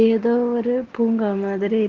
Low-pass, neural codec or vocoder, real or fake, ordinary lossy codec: 7.2 kHz; none; real; Opus, 16 kbps